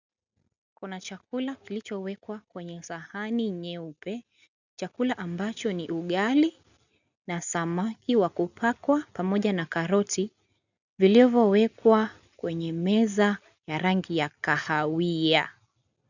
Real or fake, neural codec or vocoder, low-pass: real; none; 7.2 kHz